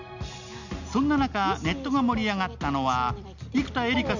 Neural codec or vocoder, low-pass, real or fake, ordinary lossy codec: none; 7.2 kHz; real; none